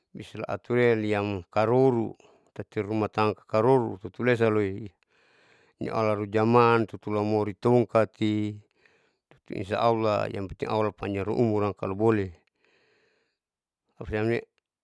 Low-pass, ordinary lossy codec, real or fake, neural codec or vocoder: none; none; real; none